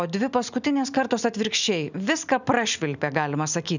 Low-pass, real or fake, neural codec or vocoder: 7.2 kHz; real; none